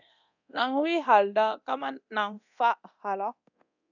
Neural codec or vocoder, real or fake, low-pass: codec, 24 kHz, 0.9 kbps, DualCodec; fake; 7.2 kHz